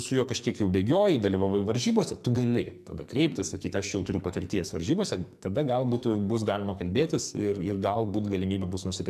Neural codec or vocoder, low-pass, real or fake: codec, 44.1 kHz, 2.6 kbps, SNAC; 14.4 kHz; fake